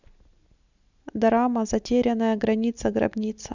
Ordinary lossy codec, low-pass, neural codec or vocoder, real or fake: none; 7.2 kHz; none; real